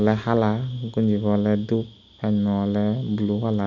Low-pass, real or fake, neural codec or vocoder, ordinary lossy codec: 7.2 kHz; real; none; none